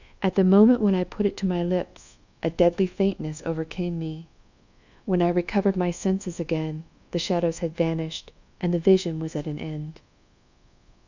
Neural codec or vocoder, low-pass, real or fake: codec, 24 kHz, 1.2 kbps, DualCodec; 7.2 kHz; fake